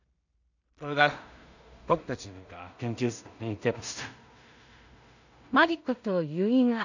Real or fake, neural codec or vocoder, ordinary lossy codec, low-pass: fake; codec, 16 kHz in and 24 kHz out, 0.4 kbps, LongCat-Audio-Codec, two codebook decoder; none; 7.2 kHz